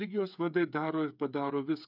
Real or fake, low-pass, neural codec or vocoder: fake; 5.4 kHz; codec, 16 kHz, 8 kbps, FreqCodec, smaller model